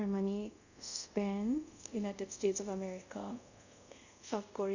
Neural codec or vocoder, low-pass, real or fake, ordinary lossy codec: codec, 24 kHz, 0.5 kbps, DualCodec; 7.2 kHz; fake; none